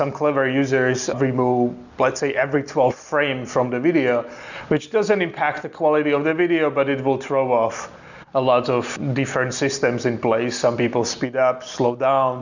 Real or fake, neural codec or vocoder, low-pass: real; none; 7.2 kHz